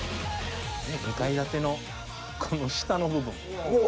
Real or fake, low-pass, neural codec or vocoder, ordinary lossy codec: real; none; none; none